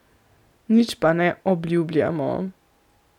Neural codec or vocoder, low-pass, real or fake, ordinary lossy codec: none; 19.8 kHz; real; none